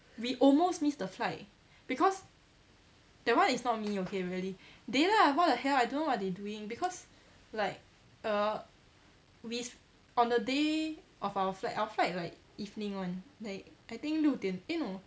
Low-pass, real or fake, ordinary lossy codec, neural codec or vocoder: none; real; none; none